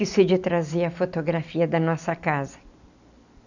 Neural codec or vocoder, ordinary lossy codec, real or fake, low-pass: none; none; real; 7.2 kHz